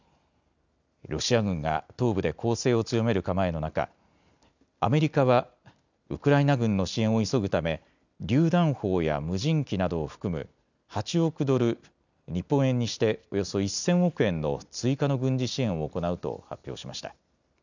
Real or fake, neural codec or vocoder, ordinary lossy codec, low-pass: real; none; none; 7.2 kHz